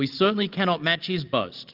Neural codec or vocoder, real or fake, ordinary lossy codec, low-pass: none; real; Opus, 32 kbps; 5.4 kHz